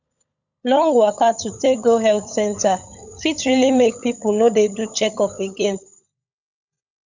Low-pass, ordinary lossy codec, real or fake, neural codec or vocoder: 7.2 kHz; none; fake; codec, 16 kHz, 16 kbps, FunCodec, trained on LibriTTS, 50 frames a second